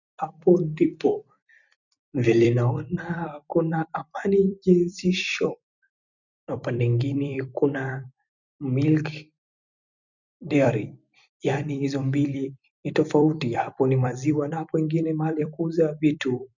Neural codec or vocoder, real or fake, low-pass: vocoder, 44.1 kHz, 128 mel bands every 256 samples, BigVGAN v2; fake; 7.2 kHz